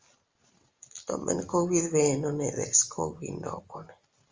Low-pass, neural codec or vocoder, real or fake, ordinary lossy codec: 7.2 kHz; none; real; Opus, 32 kbps